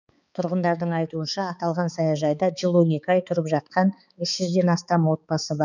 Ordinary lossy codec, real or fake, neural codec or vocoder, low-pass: none; fake; codec, 16 kHz, 4 kbps, X-Codec, HuBERT features, trained on balanced general audio; 7.2 kHz